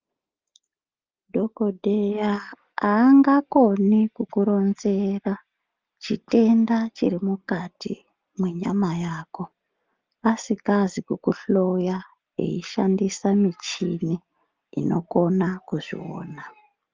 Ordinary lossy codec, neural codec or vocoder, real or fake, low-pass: Opus, 32 kbps; none; real; 7.2 kHz